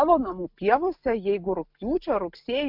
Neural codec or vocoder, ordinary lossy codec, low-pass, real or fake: none; MP3, 48 kbps; 5.4 kHz; real